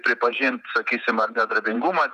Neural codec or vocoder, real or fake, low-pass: vocoder, 48 kHz, 128 mel bands, Vocos; fake; 14.4 kHz